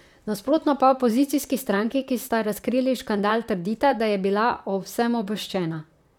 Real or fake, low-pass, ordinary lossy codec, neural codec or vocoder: fake; 19.8 kHz; none; vocoder, 44.1 kHz, 128 mel bands, Pupu-Vocoder